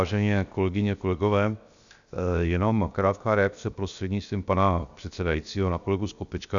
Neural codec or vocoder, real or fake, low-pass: codec, 16 kHz, 0.7 kbps, FocalCodec; fake; 7.2 kHz